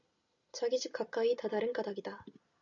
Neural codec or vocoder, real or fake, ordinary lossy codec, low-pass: none; real; AAC, 64 kbps; 7.2 kHz